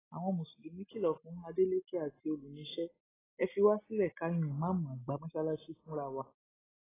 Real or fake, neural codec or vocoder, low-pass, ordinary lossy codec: real; none; 3.6 kHz; AAC, 16 kbps